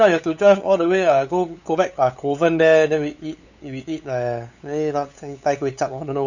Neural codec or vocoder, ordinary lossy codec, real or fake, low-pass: codec, 16 kHz, 8 kbps, FunCodec, trained on LibriTTS, 25 frames a second; none; fake; 7.2 kHz